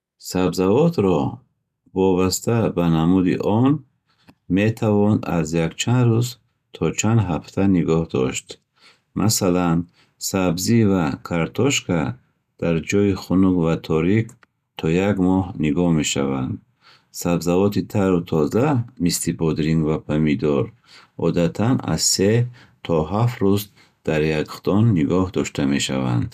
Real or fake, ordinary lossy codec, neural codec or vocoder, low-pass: real; none; none; 14.4 kHz